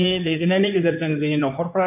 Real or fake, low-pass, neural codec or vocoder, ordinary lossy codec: fake; 3.6 kHz; codec, 16 kHz in and 24 kHz out, 2.2 kbps, FireRedTTS-2 codec; none